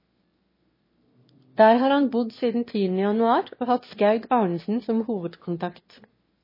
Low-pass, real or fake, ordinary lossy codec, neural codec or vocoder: 5.4 kHz; fake; MP3, 24 kbps; autoencoder, 22.05 kHz, a latent of 192 numbers a frame, VITS, trained on one speaker